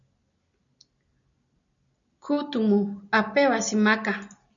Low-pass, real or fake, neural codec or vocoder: 7.2 kHz; real; none